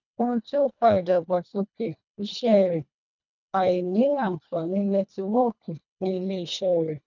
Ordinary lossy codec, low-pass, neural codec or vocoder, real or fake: none; 7.2 kHz; codec, 24 kHz, 1.5 kbps, HILCodec; fake